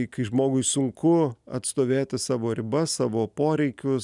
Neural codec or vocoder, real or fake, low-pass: none; real; 10.8 kHz